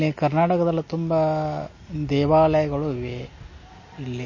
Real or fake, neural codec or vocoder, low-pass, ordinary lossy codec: real; none; 7.2 kHz; MP3, 32 kbps